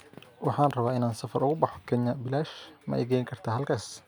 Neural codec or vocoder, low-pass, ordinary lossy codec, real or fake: none; none; none; real